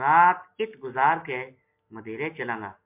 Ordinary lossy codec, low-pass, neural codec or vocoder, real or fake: MP3, 32 kbps; 3.6 kHz; none; real